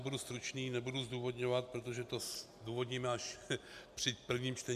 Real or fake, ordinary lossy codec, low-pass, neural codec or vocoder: real; MP3, 96 kbps; 14.4 kHz; none